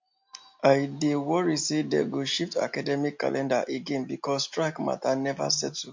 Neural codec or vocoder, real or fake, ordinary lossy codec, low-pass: none; real; MP3, 48 kbps; 7.2 kHz